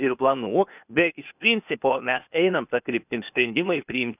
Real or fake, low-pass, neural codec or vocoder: fake; 3.6 kHz; codec, 16 kHz, 0.8 kbps, ZipCodec